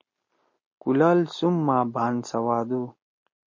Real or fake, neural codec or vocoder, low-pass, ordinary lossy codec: real; none; 7.2 kHz; MP3, 32 kbps